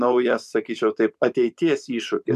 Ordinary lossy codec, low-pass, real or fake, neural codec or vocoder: MP3, 96 kbps; 14.4 kHz; fake; vocoder, 44.1 kHz, 128 mel bands every 512 samples, BigVGAN v2